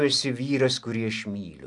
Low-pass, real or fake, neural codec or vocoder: 10.8 kHz; real; none